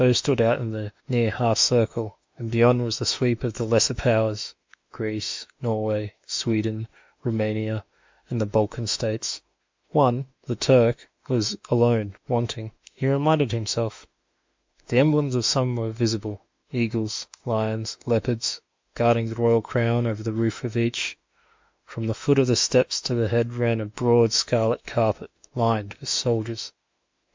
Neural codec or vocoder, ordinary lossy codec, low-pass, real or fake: autoencoder, 48 kHz, 32 numbers a frame, DAC-VAE, trained on Japanese speech; MP3, 64 kbps; 7.2 kHz; fake